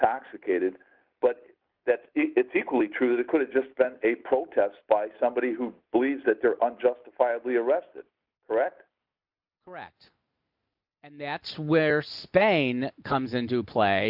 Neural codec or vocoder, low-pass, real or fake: none; 5.4 kHz; real